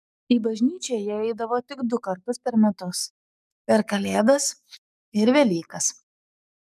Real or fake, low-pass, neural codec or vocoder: fake; 14.4 kHz; codec, 44.1 kHz, 7.8 kbps, DAC